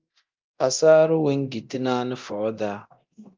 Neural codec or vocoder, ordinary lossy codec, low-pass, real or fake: codec, 24 kHz, 0.9 kbps, DualCodec; Opus, 24 kbps; 7.2 kHz; fake